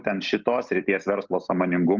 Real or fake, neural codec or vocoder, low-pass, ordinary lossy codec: real; none; 7.2 kHz; Opus, 24 kbps